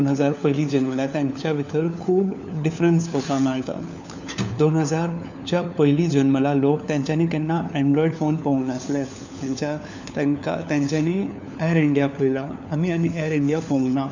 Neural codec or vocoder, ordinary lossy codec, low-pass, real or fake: codec, 16 kHz, 4 kbps, FunCodec, trained on LibriTTS, 50 frames a second; none; 7.2 kHz; fake